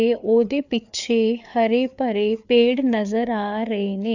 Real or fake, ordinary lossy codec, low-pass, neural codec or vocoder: fake; none; 7.2 kHz; codec, 16 kHz, 4 kbps, FreqCodec, larger model